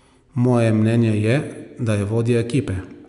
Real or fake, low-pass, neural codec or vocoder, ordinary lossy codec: real; 10.8 kHz; none; none